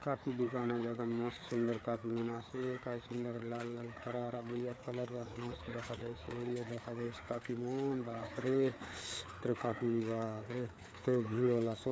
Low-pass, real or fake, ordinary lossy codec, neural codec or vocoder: none; fake; none; codec, 16 kHz, 4 kbps, FreqCodec, larger model